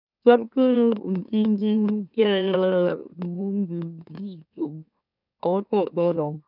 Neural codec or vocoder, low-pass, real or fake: autoencoder, 44.1 kHz, a latent of 192 numbers a frame, MeloTTS; 5.4 kHz; fake